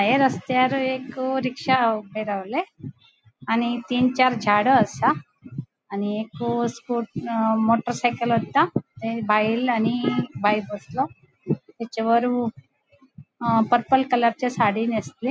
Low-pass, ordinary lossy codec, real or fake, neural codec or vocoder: none; none; real; none